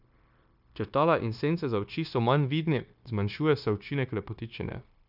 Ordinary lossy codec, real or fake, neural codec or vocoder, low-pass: none; fake; codec, 16 kHz, 0.9 kbps, LongCat-Audio-Codec; 5.4 kHz